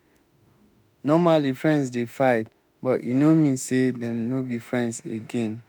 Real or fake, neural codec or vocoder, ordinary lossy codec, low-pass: fake; autoencoder, 48 kHz, 32 numbers a frame, DAC-VAE, trained on Japanese speech; none; none